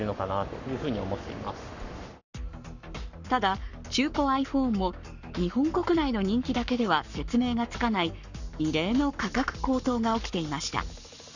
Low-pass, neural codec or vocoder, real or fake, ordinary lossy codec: 7.2 kHz; codec, 44.1 kHz, 7.8 kbps, Pupu-Codec; fake; none